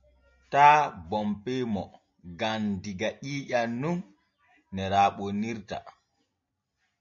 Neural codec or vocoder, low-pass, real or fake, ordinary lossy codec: none; 7.2 kHz; real; MP3, 48 kbps